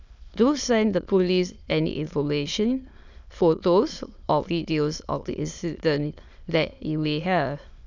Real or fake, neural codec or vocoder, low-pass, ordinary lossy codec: fake; autoencoder, 22.05 kHz, a latent of 192 numbers a frame, VITS, trained on many speakers; 7.2 kHz; none